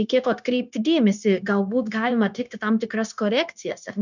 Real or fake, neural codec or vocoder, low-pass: fake; codec, 16 kHz, 0.9 kbps, LongCat-Audio-Codec; 7.2 kHz